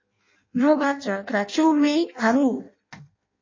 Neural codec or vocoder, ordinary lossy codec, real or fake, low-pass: codec, 16 kHz in and 24 kHz out, 0.6 kbps, FireRedTTS-2 codec; MP3, 32 kbps; fake; 7.2 kHz